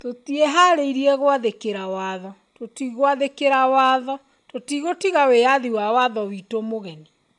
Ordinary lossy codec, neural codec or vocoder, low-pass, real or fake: MP3, 96 kbps; none; 10.8 kHz; real